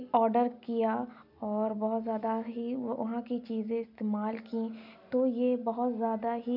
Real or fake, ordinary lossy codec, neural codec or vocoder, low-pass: real; none; none; 5.4 kHz